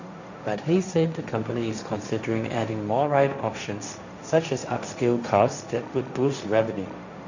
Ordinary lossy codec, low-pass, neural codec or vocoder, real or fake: none; 7.2 kHz; codec, 16 kHz, 1.1 kbps, Voila-Tokenizer; fake